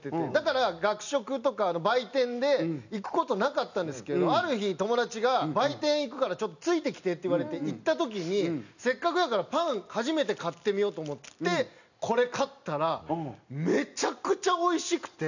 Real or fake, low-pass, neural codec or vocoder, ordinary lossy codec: real; 7.2 kHz; none; none